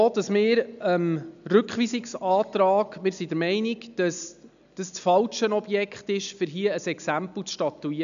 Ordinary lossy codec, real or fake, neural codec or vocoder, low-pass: none; real; none; 7.2 kHz